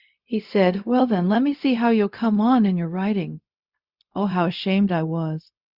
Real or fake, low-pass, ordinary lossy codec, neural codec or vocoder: fake; 5.4 kHz; Opus, 64 kbps; codec, 16 kHz, 0.4 kbps, LongCat-Audio-Codec